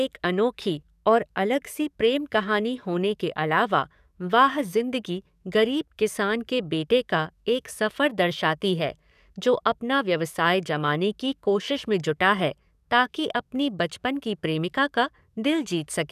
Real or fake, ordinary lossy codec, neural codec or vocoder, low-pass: fake; none; codec, 44.1 kHz, 7.8 kbps, DAC; 14.4 kHz